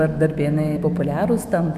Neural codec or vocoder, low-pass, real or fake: autoencoder, 48 kHz, 128 numbers a frame, DAC-VAE, trained on Japanese speech; 14.4 kHz; fake